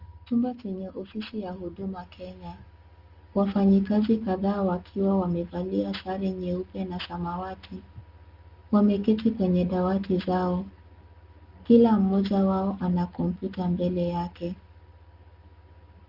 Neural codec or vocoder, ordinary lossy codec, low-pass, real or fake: none; Opus, 24 kbps; 5.4 kHz; real